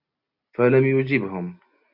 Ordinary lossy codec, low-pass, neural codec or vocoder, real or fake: AAC, 48 kbps; 5.4 kHz; none; real